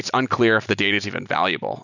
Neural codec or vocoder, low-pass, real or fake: none; 7.2 kHz; real